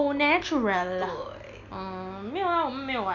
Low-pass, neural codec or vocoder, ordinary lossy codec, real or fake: 7.2 kHz; none; none; real